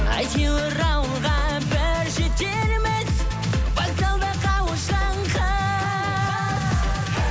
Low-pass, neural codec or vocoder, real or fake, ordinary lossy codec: none; none; real; none